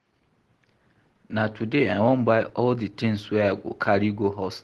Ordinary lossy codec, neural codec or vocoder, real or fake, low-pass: Opus, 16 kbps; none; real; 10.8 kHz